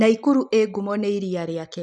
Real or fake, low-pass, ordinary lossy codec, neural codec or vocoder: real; 10.8 kHz; none; none